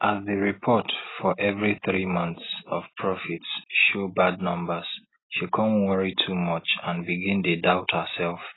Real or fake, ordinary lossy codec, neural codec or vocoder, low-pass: real; AAC, 16 kbps; none; 7.2 kHz